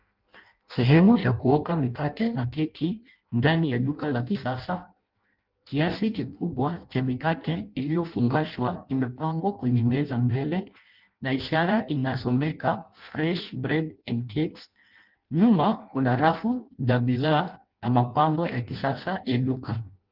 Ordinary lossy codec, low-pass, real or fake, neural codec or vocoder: Opus, 32 kbps; 5.4 kHz; fake; codec, 16 kHz in and 24 kHz out, 0.6 kbps, FireRedTTS-2 codec